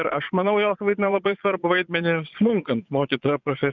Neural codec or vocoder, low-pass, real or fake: vocoder, 22.05 kHz, 80 mel bands, Vocos; 7.2 kHz; fake